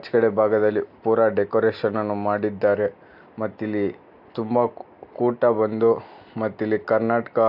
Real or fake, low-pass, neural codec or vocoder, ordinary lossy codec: real; 5.4 kHz; none; AAC, 48 kbps